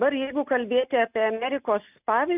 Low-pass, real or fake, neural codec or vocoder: 3.6 kHz; real; none